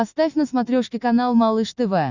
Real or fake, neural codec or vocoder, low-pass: real; none; 7.2 kHz